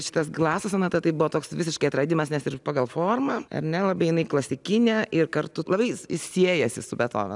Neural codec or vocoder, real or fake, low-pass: none; real; 10.8 kHz